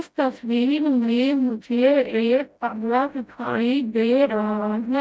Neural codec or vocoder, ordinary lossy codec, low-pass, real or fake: codec, 16 kHz, 0.5 kbps, FreqCodec, smaller model; none; none; fake